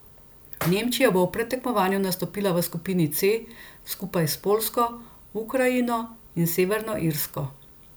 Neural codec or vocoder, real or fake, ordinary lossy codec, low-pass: none; real; none; none